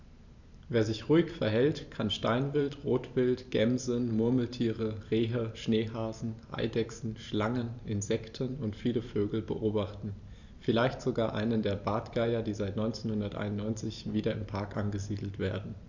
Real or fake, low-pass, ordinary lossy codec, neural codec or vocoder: fake; 7.2 kHz; none; vocoder, 44.1 kHz, 128 mel bands every 512 samples, BigVGAN v2